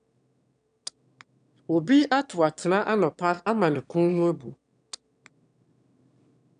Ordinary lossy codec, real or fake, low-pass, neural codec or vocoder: none; fake; 9.9 kHz; autoencoder, 22.05 kHz, a latent of 192 numbers a frame, VITS, trained on one speaker